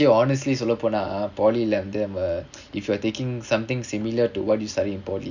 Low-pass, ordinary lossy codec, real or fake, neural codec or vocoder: 7.2 kHz; none; real; none